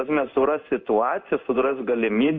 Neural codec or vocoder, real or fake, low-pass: codec, 16 kHz in and 24 kHz out, 1 kbps, XY-Tokenizer; fake; 7.2 kHz